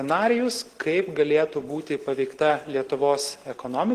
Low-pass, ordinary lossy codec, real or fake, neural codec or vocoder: 14.4 kHz; Opus, 16 kbps; real; none